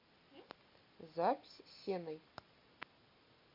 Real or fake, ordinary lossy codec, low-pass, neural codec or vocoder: real; MP3, 48 kbps; 5.4 kHz; none